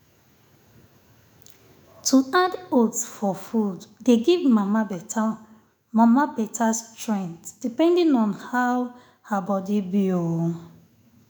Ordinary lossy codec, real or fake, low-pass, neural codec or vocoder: none; fake; none; autoencoder, 48 kHz, 128 numbers a frame, DAC-VAE, trained on Japanese speech